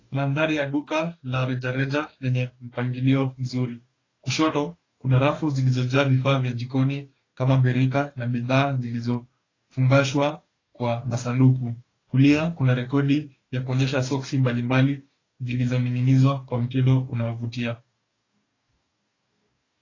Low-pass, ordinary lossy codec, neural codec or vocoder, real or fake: 7.2 kHz; AAC, 32 kbps; codec, 44.1 kHz, 2.6 kbps, DAC; fake